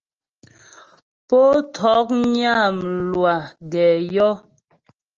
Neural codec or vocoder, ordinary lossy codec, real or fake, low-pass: none; Opus, 24 kbps; real; 7.2 kHz